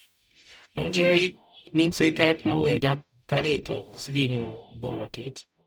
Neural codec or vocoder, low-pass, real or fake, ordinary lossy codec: codec, 44.1 kHz, 0.9 kbps, DAC; none; fake; none